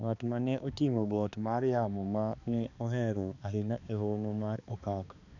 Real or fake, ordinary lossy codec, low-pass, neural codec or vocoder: fake; none; 7.2 kHz; codec, 16 kHz, 2 kbps, X-Codec, HuBERT features, trained on balanced general audio